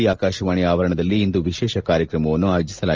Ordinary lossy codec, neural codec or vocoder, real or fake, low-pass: Opus, 24 kbps; none; real; 7.2 kHz